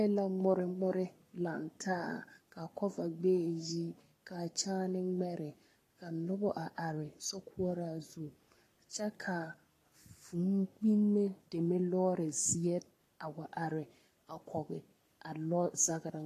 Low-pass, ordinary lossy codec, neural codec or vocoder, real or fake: 14.4 kHz; AAC, 48 kbps; codec, 44.1 kHz, 7.8 kbps, Pupu-Codec; fake